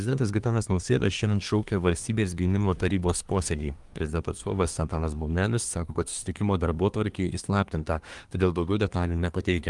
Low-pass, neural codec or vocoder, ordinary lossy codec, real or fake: 10.8 kHz; codec, 24 kHz, 1 kbps, SNAC; Opus, 32 kbps; fake